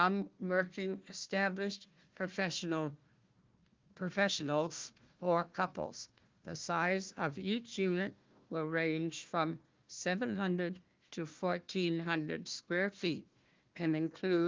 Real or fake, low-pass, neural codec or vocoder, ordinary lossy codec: fake; 7.2 kHz; codec, 16 kHz, 1 kbps, FunCodec, trained on Chinese and English, 50 frames a second; Opus, 32 kbps